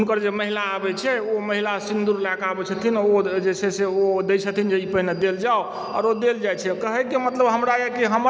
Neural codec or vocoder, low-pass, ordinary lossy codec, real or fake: none; none; none; real